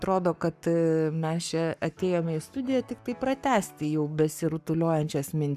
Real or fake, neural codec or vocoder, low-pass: fake; codec, 44.1 kHz, 7.8 kbps, Pupu-Codec; 14.4 kHz